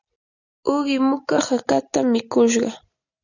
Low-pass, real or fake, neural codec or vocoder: 7.2 kHz; real; none